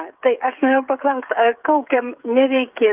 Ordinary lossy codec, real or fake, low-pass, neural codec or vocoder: Opus, 24 kbps; fake; 3.6 kHz; codec, 16 kHz, 8 kbps, FreqCodec, smaller model